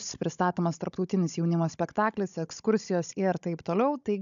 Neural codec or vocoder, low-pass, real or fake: codec, 16 kHz, 16 kbps, FunCodec, trained on Chinese and English, 50 frames a second; 7.2 kHz; fake